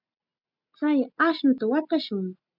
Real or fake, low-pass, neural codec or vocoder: real; 5.4 kHz; none